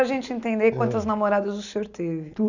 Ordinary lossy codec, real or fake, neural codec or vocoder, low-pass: none; real; none; 7.2 kHz